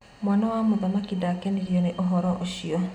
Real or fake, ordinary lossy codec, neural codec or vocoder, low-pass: real; none; none; 19.8 kHz